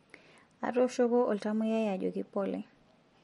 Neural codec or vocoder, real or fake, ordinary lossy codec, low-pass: none; real; MP3, 48 kbps; 19.8 kHz